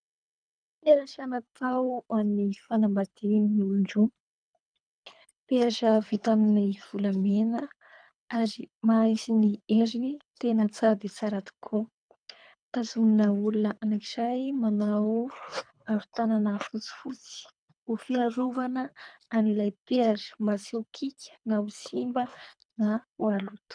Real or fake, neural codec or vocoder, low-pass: fake; codec, 24 kHz, 3 kbps, HILCodec; 9.9 kHz